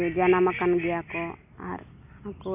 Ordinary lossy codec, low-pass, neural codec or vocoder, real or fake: none; 3.6 kHz; none; real